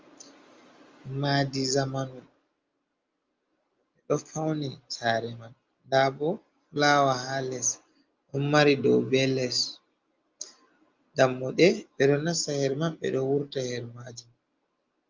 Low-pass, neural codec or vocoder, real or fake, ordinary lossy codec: 7.2 kHz; none; real; Opus, 32 kbps